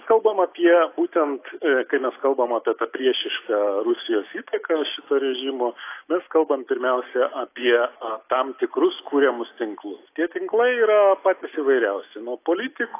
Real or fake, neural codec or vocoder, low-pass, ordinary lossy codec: real; none; 3.6 kHz; AAC, 24 kbps